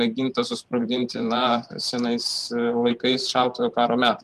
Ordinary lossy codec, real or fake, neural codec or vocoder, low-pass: Opus, 32 kbps; fake; vocoder, 44.1 kHz, 128 mel bands every 512 samples, BigVGAN v2; 14.4 kHz